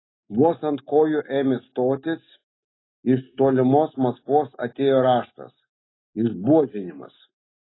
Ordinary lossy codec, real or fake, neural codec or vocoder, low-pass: AAC, 16 kbps; real; none; 7.2 kHz